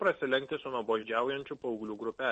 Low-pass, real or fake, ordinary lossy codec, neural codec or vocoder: 10.8 kHz; real; MP3, 32 kbps; none